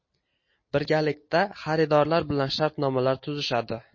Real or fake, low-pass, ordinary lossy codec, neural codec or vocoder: real; 7.2 kHz; MP3, 32 kbps; none